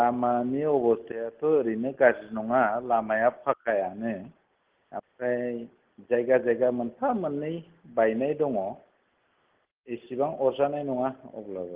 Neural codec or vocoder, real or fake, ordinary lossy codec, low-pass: none; real; Opus, 16 kbps; 3.6 kHz